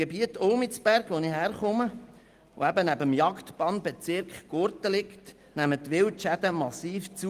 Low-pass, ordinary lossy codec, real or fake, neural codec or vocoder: 14.4 kHz; Opus, 24 kbps; real; none